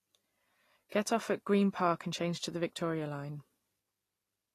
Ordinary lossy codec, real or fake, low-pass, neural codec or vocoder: AAC, 48 kbps; real; 14.4 kHz; none